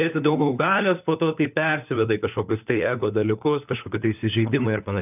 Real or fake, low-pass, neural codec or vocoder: fake; 3.6 kHz; codec, 16 kHz, 4 kbps, FunCodec, trained on LibriTTS, 50 frames a second